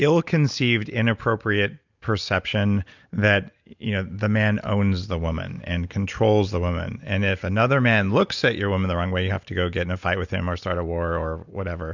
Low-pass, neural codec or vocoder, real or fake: 7.2 kHz; none; real